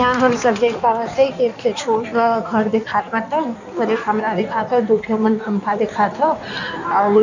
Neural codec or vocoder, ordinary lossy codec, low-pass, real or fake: codec, 16 kHz in and 24 kHz out, 1.1 kbps, FireRedTTS-2 codec; none; 7.2 kHz; fake